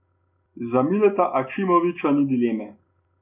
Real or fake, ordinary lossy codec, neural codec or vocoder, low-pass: real; none; none; 3.6 kHz